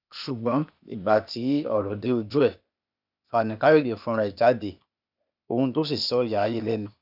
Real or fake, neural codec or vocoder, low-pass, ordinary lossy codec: fake; codec, 16 kHz, 0.8 kbps, ZipCodec; 5.4 kHz; none